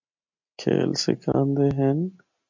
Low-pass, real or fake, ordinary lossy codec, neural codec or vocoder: 7.2 kHz; real; MP3, 64 kbps; none